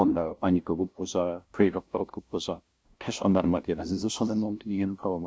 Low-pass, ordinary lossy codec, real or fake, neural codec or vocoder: none; none; fake; codec, 16 kHz, 0.5 kbps, FunCodec, trained on LibriTTS, 25 frames a second